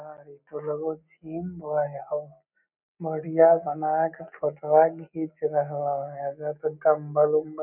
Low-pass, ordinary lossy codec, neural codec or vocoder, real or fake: 3.6 kHz; none; none; real